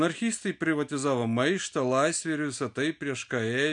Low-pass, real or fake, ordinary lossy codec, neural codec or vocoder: 9.9 kHz; real; MP3, 64 kbps; none